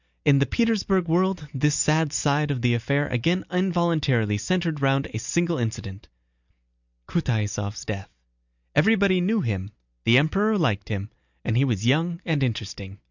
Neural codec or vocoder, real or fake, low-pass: none; real; 7.2 kHz